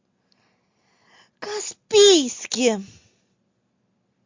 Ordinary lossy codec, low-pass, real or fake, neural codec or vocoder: MP3, 48 kbps; 7.2 kHz; real; none